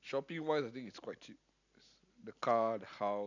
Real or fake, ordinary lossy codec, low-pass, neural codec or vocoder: real; none; 7.2 kHz; none